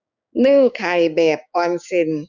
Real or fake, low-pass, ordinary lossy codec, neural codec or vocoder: fake; 7.2 kHz; none; codec, 16 kHz, 6 kbps, DAC